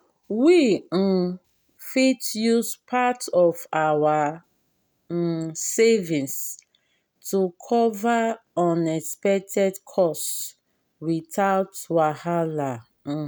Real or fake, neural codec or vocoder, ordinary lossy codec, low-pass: real; none; none; none